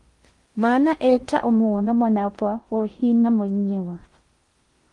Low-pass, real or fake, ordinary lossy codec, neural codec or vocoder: 10.8 kHz; fake; Opus, 24 kbps; codec, 16 kHz in and 24 kHz out, 0.6 kbps, FocalCodec, streaming, 2048 codes